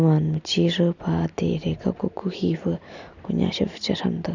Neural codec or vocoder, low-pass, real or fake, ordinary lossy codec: none; 7.2 kHz; real; none